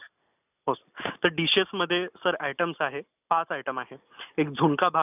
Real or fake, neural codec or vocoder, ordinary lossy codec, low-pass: real; none; none; 3.6 kHz